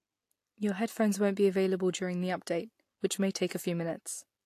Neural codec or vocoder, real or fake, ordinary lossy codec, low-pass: none; real; AAC, 64 kbps; 14.4 kHz